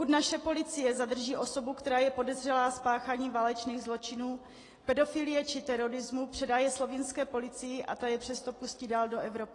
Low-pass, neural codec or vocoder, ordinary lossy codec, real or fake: 10.8 kHz; none; AAC, 32 kbps; real